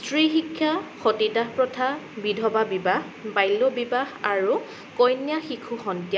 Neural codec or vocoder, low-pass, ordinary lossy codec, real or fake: none; none; none; real